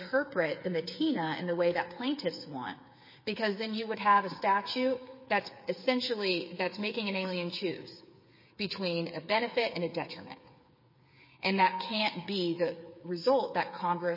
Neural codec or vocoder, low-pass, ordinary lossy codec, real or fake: codec, 16 kHz, 8 kbps, FreqCodec, smaller model; 5.4 kHz; MP3, 24 kbps; fake